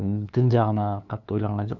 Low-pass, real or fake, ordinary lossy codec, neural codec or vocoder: 7.2 kHz; fake; none; codec, 16 kHz, 2 kbps, FunCodec, trained on LibriTTS, 25 frames a second